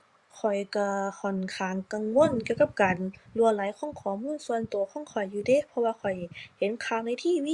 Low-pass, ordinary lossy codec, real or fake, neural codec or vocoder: 10.8 kHz; Opus, 64 kbps; fake; vocoder, 24 kHz, 100 mel bands, Vocos